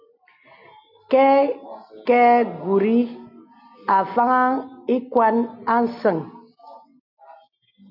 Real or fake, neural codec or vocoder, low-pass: real; none; 5.4 kHz